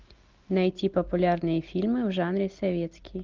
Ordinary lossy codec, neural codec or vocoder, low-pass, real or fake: Opus, 24 kbps; none; 7.2 kHz; real